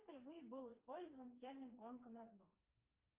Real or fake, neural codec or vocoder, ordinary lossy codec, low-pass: fake; codec, 16 kHz, 2 kbps, FreqCodec, smaller model; Opus, 24 kbps; 3.6 kHz